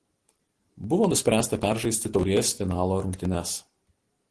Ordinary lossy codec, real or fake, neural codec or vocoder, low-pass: Opus, 16 kbps; real; none; 10.8 kHz